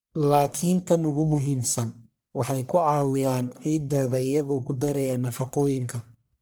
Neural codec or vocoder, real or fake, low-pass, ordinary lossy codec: codec, 44.1 kHz, 1.7 kbps, Pupu-Codec; fake; none; none